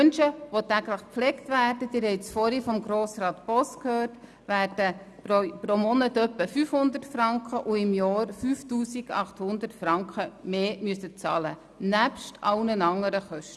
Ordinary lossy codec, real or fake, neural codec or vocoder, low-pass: none; real; none; none